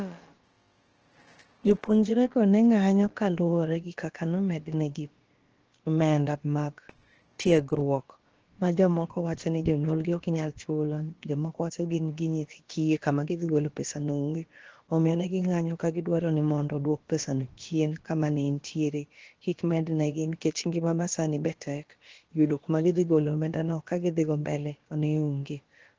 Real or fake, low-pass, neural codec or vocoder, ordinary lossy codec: fake; 7.2 kHz; codec, 16 kHz, about 1 kbps, DyCAST, with the encoder's durations; Opus, 16 kbps